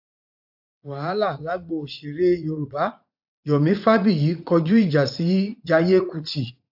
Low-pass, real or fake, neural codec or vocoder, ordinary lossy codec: 5.4 kHz; fake; vocoder, 44.1 kHz, 80 mel bands, Vocos; AAC, 48 kbps